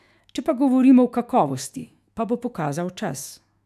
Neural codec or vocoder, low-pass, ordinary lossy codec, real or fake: autoencoder, 48 kHz, 128 numbers a frame, DAC-VAE, trained on Japanese speech; 14.4 kHz; none; fake